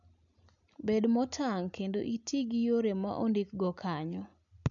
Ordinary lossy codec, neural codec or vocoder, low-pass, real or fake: none; none; 7.2 kHz; real